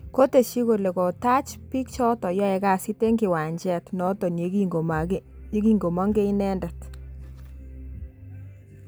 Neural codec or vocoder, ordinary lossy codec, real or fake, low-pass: none; none; real; none